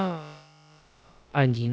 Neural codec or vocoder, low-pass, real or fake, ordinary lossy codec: codec, 16 kHz, about 1 kbps, DyCAST, with the encoder's durations; none; fake; none